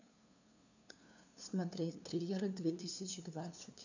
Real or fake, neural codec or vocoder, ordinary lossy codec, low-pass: fake; codec, 16 kHz, 2 kbps, FunCodec, trained on LibriTTS, 25 frames a second; none; 7.2 kHz